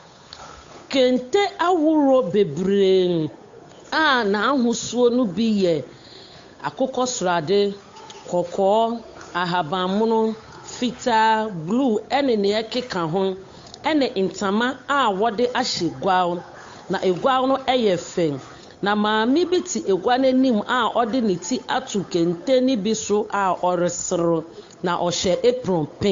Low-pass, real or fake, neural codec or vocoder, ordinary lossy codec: 7.2 kHz; fake; codec, 16 kHz, 8 kbps, FunCodec, trained on Chinese and English, 25 frames a second; AAC, 48 kbps